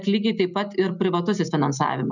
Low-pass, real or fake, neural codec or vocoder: 7.2 kHz; real; none